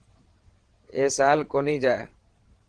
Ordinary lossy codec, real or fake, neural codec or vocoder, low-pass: Opus, 16 kbps; fake; vocoder, 22.05 kHz, 80 mel bands, WaveNeXt; 9.9 kHz